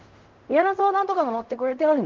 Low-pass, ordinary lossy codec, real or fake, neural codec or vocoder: 7.2 kHz; Opus, 32 kbps; fake; codec, 16 kHz in and 24 kHz out, 0.4 kbps, LongCat-Audio-Codec, fine tuned four codebook decoder